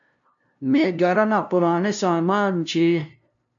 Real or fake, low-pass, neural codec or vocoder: fake; 7.2 kHz; codec, 16 kHz, 0.5 kbps, FunCodec, trained on LibriTTS, 25 frames a second